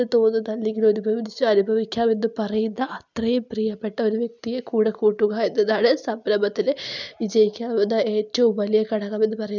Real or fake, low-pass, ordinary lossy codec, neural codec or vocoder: real; 7.2 kHz; none; none